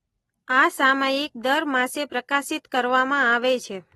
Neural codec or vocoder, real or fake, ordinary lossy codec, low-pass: vocoder, 44.1 kHz, 128 mel bands every 256 samples, BigVGAN v2; fake; AAC, 32 kbps; 19.8 kHz